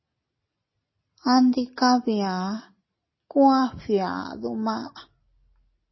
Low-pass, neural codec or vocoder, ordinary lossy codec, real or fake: 7.2 kHz; none; MP3, 24 kbps; real